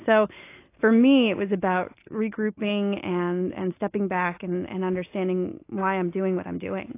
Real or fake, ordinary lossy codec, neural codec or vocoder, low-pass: real; AAC, 24 kbps; none; 3.6 kHz